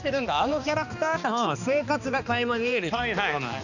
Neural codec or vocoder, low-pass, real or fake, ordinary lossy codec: codec, 16 kHz, 2 kbps, X-Codec, HuBERT features, trained on balanced general audio; 7.2 kHz; fake; none